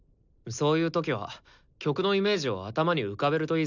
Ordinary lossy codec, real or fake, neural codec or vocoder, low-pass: none; real; none; 7.2 kHz